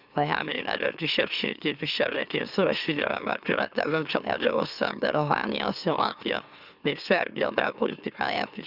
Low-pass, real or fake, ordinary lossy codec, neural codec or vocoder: 5.4 kHz; fake; Opus, 64 kbps; autoencoder, 44.1 kHz, a latent of 192 numbers a frame, MeloTTS